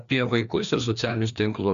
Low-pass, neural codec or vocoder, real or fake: 7.2 kHz; codec, 16 kHz, 2 kbps, FreqCodec, larger model; fake